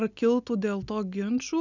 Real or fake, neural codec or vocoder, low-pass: real; none; 7.2 kHz